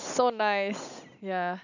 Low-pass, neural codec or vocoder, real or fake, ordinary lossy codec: 7.2 kHz; none; real; none